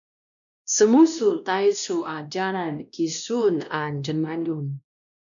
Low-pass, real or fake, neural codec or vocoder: 7.2 kHz; fake; codec, 16 kHz, 1 kbps, X-Codec, WavLM features, trained on Multilingual LibriSpeech